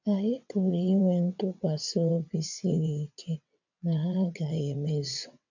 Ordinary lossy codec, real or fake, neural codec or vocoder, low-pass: none; fake; vocoder, 22.05 kHz, 80 mel bands, WaveNeXt; 7.2 kHz